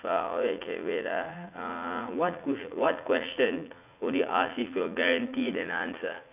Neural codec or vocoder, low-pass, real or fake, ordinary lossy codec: vocoder, 44.1 kHz, 80 mel bands, Vocos; 3.6 kHz; fake; none